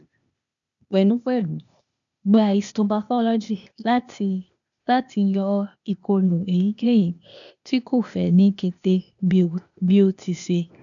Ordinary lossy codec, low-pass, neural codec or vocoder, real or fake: none; 7.2 kHz; codec, 16 kHz, 0.8 kbps, ZipCodec; fake